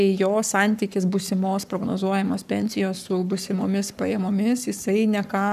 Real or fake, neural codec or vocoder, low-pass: fake; codec, 44.1 kHz, 7.8 kbps, Pupu-Codec; 14.4 kHz